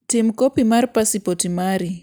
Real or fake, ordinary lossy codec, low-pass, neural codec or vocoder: real; none; none; none